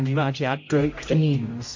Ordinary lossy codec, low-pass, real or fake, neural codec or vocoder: MP3, 48 kbps; 7.2 kHz; fake; codec, 16 kHz, 0.5 kbps, X-Codec, HuBERT features, trained on general audio